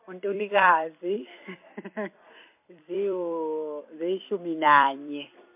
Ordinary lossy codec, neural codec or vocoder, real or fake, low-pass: MP3, 32 kbps; vocoder, 44.1 kHz, 128 mel bands every 256 samples, BigVGAN v2; fake; 3.6 kHz